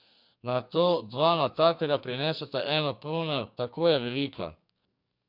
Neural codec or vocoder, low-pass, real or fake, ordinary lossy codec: codec, 44.1 kHz, 2.6 kbps, SNAC; 5.4 kHz; fake; MP3, 48 kbps